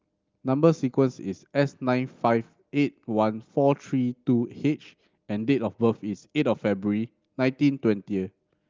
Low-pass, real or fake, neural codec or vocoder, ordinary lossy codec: 7.2 kHz; real; none; Opus, 24 kbps